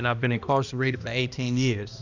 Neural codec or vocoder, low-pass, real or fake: codec, 16 kHz, 1 kbps, X-Codec, HuBERT features, trained on balanced general audio; 7.2 kHz; fake